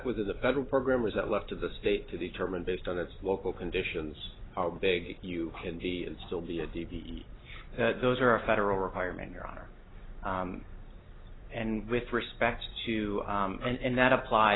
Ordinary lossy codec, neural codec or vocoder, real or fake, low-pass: AAC, 16 kbps; none; real; 7.2 kHz